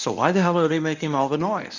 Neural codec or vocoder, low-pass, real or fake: codec, 24 kHz, 0.9 kbps, WavTokenizer, medium speech release version 1; 7.2 kHz; fake